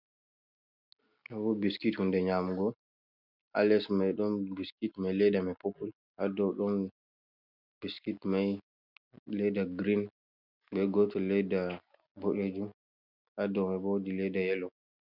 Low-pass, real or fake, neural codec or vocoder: 5.4 kHz; real; none